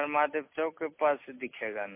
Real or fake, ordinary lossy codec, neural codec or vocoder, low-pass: real; MP3, 24 kbps; none; 3.6 kHz